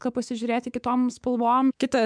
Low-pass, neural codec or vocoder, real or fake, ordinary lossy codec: 9.9 kHz; codec, 24 kHz, 3.1 kbps, DualCodec; fake; MP3, 96 kbps